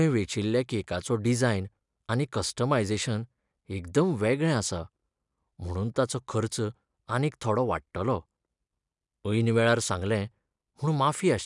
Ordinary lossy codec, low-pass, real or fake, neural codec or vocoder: none; 10.8 kHz; real; none